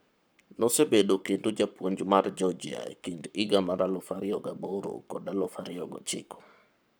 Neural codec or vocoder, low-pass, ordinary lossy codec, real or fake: codec, 44.1 kHz, 7.8 kbps, Pupu-Codec; none; none; fake